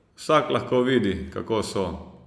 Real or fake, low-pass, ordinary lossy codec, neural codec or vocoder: real; none; none; none